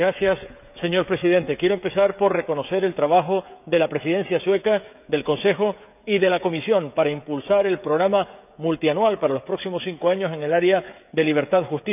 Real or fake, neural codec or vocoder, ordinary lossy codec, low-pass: fake; codec, 16 kHz, 16 kbps, FreqCodec, smaller model; none; 3.6 kHz